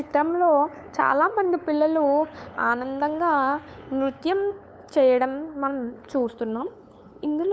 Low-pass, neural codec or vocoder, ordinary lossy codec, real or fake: none; codec, 16 kHz, 8 kbps, FunCodec, trained on LibriTTS, 25 frames a second; none; fake